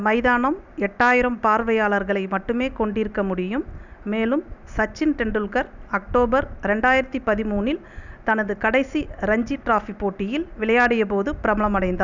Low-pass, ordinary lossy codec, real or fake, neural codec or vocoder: 7.2 kHz; none; real; none